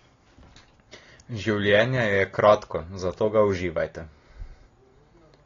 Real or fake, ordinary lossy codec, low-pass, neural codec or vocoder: real; AAC, 32 kbps; 7.2 kHz; none